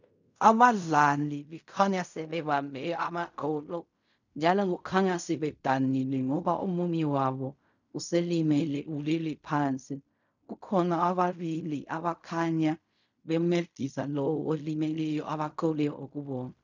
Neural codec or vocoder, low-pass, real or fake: codec, 16 kHz in and 24 kHz out, 0.4 kbps, LongCat-Audio-Codec, fine tuned four codebook decoder; 7.2 kHz; fake